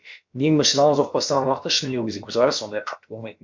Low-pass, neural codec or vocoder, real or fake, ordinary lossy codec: 7.2 kHz; codec, 16 kHz, about 1 kbps, DyCAST, with the encoder's durations; fake; MP3, 64 kbps